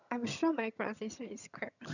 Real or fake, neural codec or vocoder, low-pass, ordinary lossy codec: fake; vocoder, 22.05 kHz, 80 mel bands, HiFi-GAN; 7.2 kHz; none